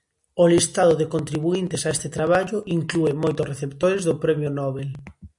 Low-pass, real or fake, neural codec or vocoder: 10.8 kHz; real; none